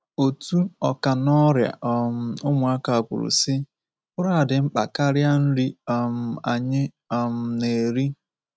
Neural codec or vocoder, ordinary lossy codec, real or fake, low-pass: none; none; real; none